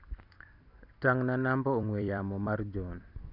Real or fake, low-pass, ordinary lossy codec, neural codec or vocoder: fake; 5.4 kHz; Opus, 64 kbps; vocoder, 44.1 kHz, 128 mel bands every 256 samples, BigVGAN v2